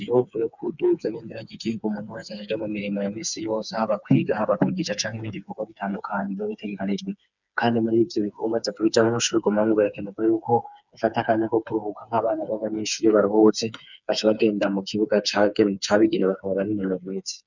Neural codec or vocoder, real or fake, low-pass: codec, 16 kHz, 4 kbps, FreqCodec, smaller model; fake; 7.2 kHz